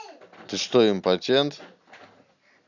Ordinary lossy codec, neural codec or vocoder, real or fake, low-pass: none; none; real; 7.2 kHz